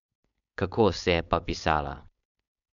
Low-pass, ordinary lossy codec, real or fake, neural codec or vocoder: 7.2 kHz; none; fake; codec, 16 kHz, 4.8 kbps, FACodec